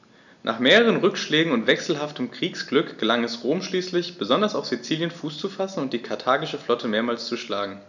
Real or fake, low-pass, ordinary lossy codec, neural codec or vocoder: real; 7.2 kHz; none; none